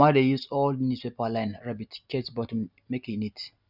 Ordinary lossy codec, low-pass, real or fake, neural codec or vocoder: none; 5.4 kHz; real; none